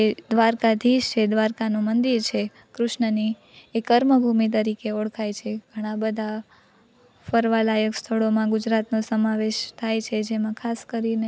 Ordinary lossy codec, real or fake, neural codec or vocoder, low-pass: none; real; none; none